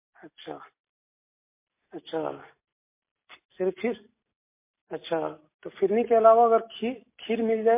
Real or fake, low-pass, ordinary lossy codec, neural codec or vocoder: real; 3.6 kHz; MP3, 24 kbps; none